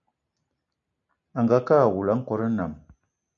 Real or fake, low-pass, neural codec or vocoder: real; 7.2 kHz; none